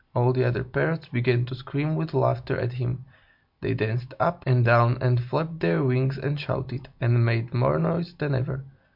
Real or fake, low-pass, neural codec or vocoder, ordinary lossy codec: real; 5.4 kHz; none; MP3, 48 kbps